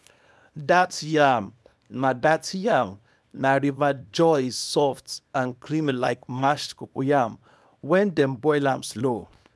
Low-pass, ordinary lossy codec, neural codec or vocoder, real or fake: none; none; codec, 24 kHz, 0.9 kbps, WavTokenizer, small release; fake